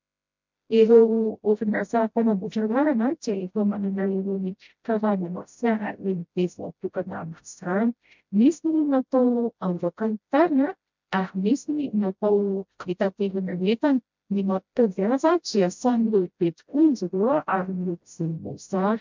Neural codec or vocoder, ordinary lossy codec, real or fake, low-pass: codec, 16 kHz, 0.5 kbps, FreqCodec, smaller model; MP3, 64 kbps; fake; 7.2 kHz